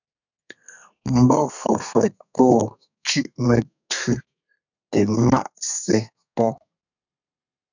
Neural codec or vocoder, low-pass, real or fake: codec, 32 kHz, 1.9 kbps, SNAC; 7.2 kHz; fake